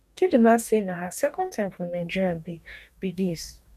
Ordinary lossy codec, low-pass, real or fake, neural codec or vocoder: none; 14.4 kHz; fake; codec, 44.1 kHz, 2.6 kbps, DAC